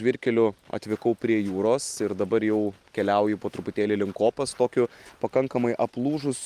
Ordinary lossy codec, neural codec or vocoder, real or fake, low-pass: Opus, 32 kbps; none; real; 14.4 kHz